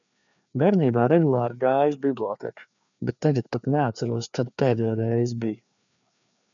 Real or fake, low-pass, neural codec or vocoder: fake; 7.2 kHz; codec, 16 kHz, 2 kbps, FreqCodec, larger model